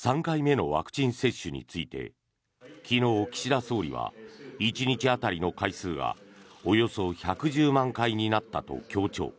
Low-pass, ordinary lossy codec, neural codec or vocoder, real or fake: none; none; none; real